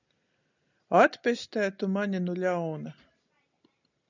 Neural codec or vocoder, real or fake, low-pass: none; real; 7.2 kHz